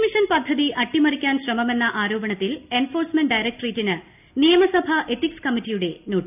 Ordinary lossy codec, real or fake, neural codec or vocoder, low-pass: none; real; none; 3.6 kHz